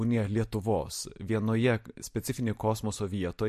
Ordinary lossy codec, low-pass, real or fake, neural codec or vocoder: MP3, 64 kbps; 14.4 kHz; real; none